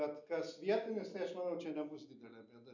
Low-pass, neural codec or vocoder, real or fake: 7.2 kHz; none; real